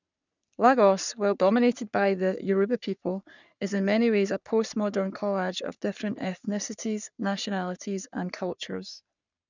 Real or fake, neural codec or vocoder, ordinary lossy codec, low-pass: fake; codec, 44.1 kHz, 3.4 kbps, Pupu-Codec; none; 7.2 kHz